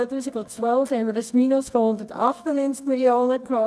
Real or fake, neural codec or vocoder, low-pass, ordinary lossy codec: fake; codec, 24 kHz, 0.9 kbps, WavTokenizer, medium music audio release; none; none